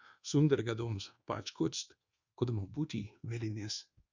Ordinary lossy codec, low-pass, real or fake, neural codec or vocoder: Opus, 64 kbps; 7.2 kHz; fake; codec, 24 kHz, 1.2 kbps, DualCodec